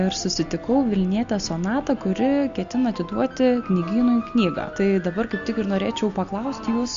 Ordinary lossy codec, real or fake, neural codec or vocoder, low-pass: Opus, 64 kbps; real; none; 7.2 kHz